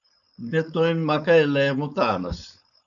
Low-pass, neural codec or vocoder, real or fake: 7.2 kHz; codec, 16 kHz, 4.8 kbps, FACodec; fake